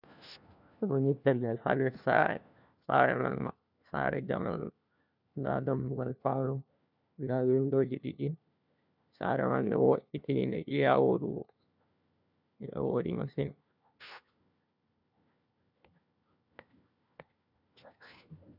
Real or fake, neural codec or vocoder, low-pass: fake; codec, 16 kHz, 1 kbps, FunCodec, trained on LibriTTS, 50 frames a second; 5.4 kHz